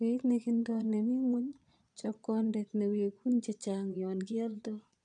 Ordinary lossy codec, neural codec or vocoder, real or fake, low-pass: none; vocoder, 22.05 kHz, 80 mel bands, Vocos; fake; 9.9 kHz